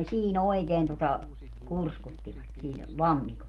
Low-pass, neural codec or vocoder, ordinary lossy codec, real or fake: 19.8 kHz; none; Opus, 24 kbps; real